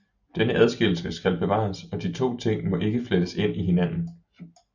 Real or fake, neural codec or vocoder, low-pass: real; none; 7.2 kHz